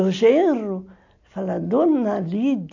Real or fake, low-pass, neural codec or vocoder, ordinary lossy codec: real; 7.2 kHz; none; AAC, 48 kbps